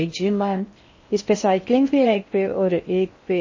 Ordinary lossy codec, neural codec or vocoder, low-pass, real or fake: MP3, 32 kbps; codec, 16 kHz in and 24 kHz out, 0.6 kbps, FocalCodec, streaming, 4096 codes; 7.2 kHz; fake